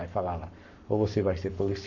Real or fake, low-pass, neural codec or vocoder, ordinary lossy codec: fake; 7.2 kHz; codec, 44.1 kHz, 7.8 kbps, Pupu-Codec; none